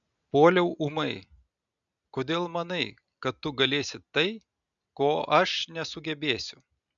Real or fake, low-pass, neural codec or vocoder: real; 7.2 kHz; none